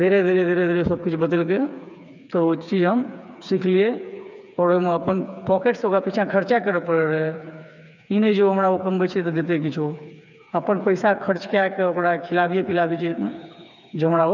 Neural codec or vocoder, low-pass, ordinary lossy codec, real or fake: codec, 16 kHz, 4 kbps, FreqCodec, smaller model; 7.2 kHz; none; fake